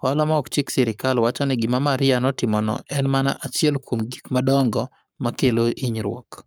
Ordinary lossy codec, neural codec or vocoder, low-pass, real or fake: none; codec, 44.1 kHz, 7.8 kbps, Pupu-Codec; none; fake